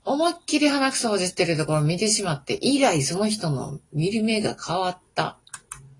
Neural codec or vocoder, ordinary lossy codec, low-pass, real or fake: none; AAC, 32 kbps; 10.8 kHz; real